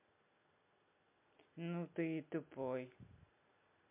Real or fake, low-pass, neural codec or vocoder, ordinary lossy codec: real; 3.6 kHz; none; MP3, 32 kbps